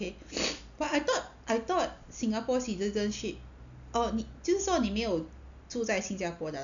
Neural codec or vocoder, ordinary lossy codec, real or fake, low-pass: none; none; real; 7.2 kHz